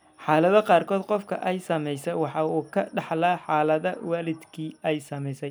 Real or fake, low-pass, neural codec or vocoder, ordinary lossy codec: real; none; none; none